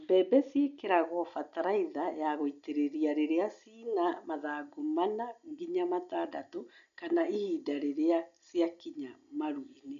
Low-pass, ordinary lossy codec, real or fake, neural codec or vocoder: 7.2 kHz; none; real; none